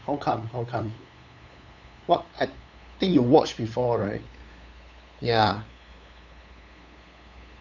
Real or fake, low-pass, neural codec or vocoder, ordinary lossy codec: fake; 7.2 kHz; codec, 16 kHz, 8 kbps, FunCodec, trained on LibriTTS, 25 frames a second; none